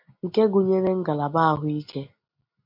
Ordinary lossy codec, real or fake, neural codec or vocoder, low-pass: MP3, 48 kbps; real; none; 5.4 kHz